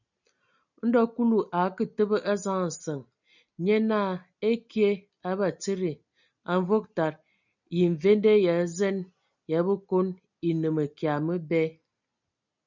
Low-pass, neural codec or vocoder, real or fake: 7.2 kHz; none; real